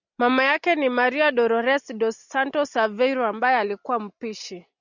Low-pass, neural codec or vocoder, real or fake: 7.2 kHz; none; real